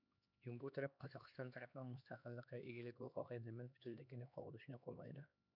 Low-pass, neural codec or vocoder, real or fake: 5.4 kHz; codec, 16 kHz, 2 kbps, X-Codec, HuBERT features, trained on LibriSpeech; fake